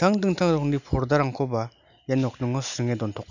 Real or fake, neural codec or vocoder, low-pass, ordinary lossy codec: real; none; 7.2 kHz; none